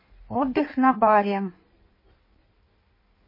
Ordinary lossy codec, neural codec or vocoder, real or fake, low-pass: MP3, 24 kbps; codec, 16 kHz in and 24 kHz out, 1.1 kbps, FireRedTTS-2 codec; fake; 5.4 kHz